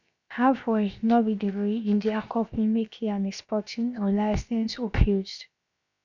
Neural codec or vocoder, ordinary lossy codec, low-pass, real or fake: codec, 16 kHz, about 1 kbps, DyCAST, with the encoder's durations; none; 7.2 kHz; fake